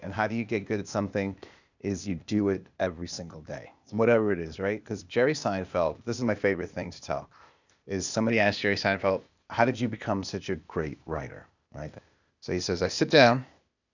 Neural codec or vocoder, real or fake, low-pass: codec, 16 kHz, 0.8 kbps, ZipCodec; fake; 7.2 kHz